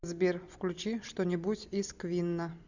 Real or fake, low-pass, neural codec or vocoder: real; 7.2 kHz; none